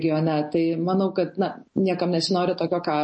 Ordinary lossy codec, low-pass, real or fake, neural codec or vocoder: MP3, 32 kbps; 9.9 kHz; real; none